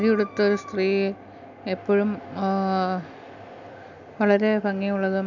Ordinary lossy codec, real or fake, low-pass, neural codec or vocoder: none; real; 7.2 kHz; none